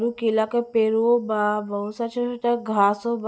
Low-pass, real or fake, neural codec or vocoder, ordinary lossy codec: none; real; none; none